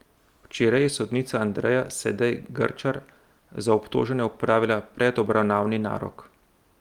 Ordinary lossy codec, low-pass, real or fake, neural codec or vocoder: Opus, 32 kbps; 19.8 kHz; real; none